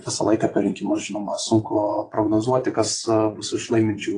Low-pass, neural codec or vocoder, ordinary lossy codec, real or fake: 9.9 kHz; vocoder, 22.05 kHz, 80 mel bands, WaveNeXt; AAC, 32 kbps; fake